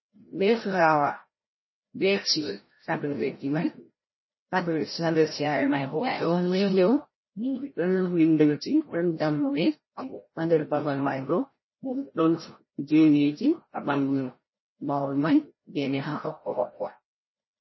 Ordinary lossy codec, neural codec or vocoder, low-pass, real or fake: MP3, 24 kbps; codec, 16 kHz, 0.5 kbps, FreqCodec, larger model; 7.2 kHz; fake